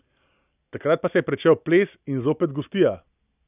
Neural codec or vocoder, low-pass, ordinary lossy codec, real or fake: none; 3.6 kHz; none; real